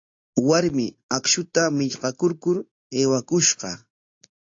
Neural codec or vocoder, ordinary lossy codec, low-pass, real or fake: none; AAC, 48 kbps; 7.2 kHz; real